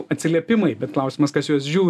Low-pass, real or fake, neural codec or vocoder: 14.4 kHz; real; none